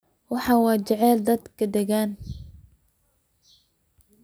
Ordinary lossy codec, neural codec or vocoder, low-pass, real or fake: none; none; none; real